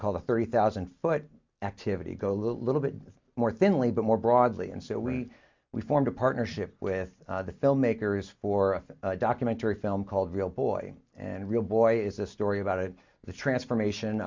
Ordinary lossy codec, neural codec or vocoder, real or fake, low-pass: MP3, 64 kbps; none; real; 7.2 kHz